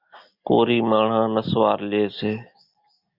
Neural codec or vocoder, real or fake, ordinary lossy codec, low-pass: none; real; Opus, 64 kbps; 5.4 kHz